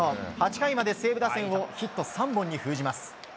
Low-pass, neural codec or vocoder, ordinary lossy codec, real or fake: none; none; none; real